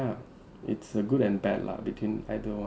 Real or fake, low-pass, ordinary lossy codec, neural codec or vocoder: real; none; none; none